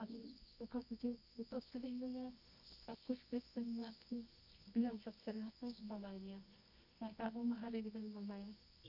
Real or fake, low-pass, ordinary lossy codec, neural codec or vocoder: fake; 5.4 kHz; none; codec, 24 kHz, 0.9 kbps, WavTokenizer, medium music audio release